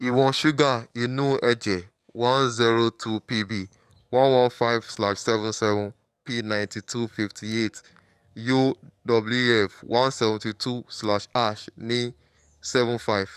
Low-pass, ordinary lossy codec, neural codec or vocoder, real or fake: 14.4 kHz; none; codec, 44.1 kHz, 7.8 kbps, DAC; fake